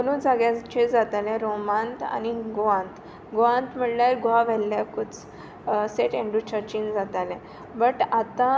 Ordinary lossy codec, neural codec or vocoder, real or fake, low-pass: none; none; real; none